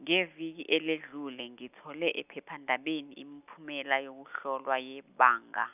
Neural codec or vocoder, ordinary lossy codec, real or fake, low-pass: none; none; real; 3.6 kHz